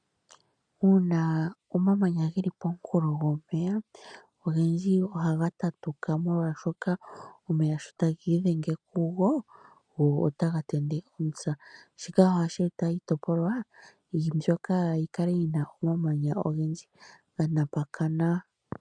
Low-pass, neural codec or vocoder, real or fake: 9.9 kHz; none; real